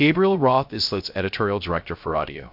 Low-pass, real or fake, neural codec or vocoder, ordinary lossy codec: 5.4 kHz; fake; codec, 16 kHz, 0.3 kbps, FocalCodec; MP3, 48 kbps